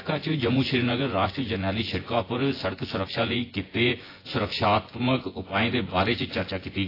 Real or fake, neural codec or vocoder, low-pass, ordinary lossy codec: fake; vocoder, 24 kHz, 100 mel bands, Vocos; 5.4 kHz; AAC, 24 kbps